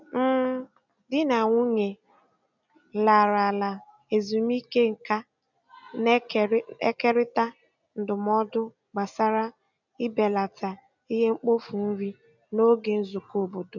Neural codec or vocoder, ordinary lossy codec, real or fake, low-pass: none; none; real; 7.2 kHz